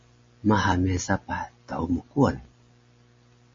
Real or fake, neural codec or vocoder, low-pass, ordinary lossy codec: real; none; 7.2 kHz; MP3, 32 kbps